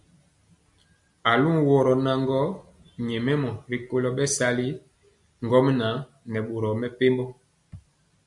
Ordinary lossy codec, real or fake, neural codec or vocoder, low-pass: MP3, 96 kbps; real; none; 10.8 kHz